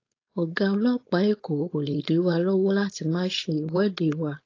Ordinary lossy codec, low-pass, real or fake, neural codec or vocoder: AAC, 32 kbps; 7.2 kHz; fake; codec, 16 kHz, 4.8 kbps, FACodec